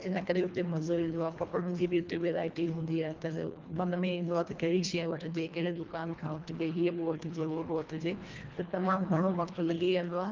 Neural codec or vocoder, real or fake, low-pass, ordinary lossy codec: codec, 24 kHz, 1.5 kbps, HILCodec; fake; 7.2 kHz; Opus, 32 kbps